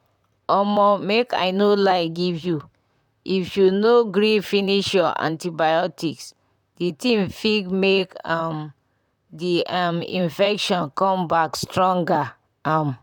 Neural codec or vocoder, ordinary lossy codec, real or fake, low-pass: vocoder, 44.1 kHz, 128 mel bands, Pupu-Vocoder; none; fake; 19.8 kHz